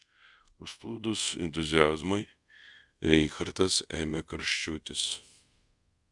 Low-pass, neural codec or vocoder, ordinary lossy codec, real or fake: 10.8 kHz; codec, 24 kHz, 0.5 kbps, DualCodec; Opus, 64 kbps; fake